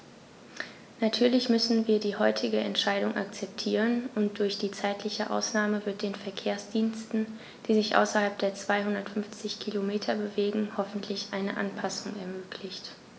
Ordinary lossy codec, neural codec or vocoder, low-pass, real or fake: none; none; none; real